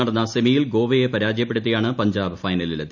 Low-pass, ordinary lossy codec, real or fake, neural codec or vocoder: 7.2 kHz; none; real; none